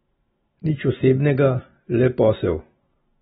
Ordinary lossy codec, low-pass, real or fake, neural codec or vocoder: AAC, 16 kbps; 19.8 kHz; real; none